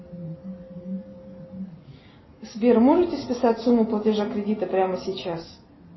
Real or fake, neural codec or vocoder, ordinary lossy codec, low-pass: real; none; MP3, 24 kbps; 7.2 kHz